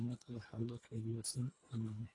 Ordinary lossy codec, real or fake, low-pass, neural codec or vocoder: AAC, 32 kbps; fake; 10.8 kHz; codec, 24 kHz, 1.5 kbps, HILCodec